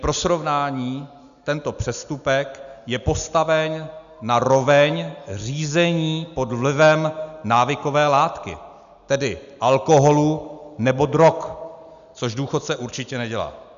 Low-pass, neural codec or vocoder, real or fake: 7.2 kHz; none; real